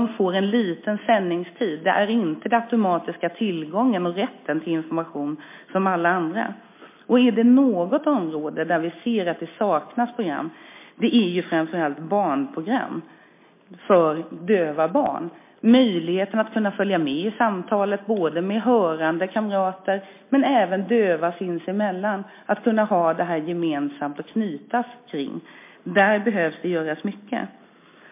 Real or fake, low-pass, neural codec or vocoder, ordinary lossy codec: real; 3.6 kHz; none; MP3, 24 kbps